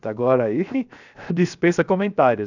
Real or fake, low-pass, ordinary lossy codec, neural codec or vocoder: fake; 7.2 kHz; none; codec, 16 kHz, 0.7 kbps, FocalCodec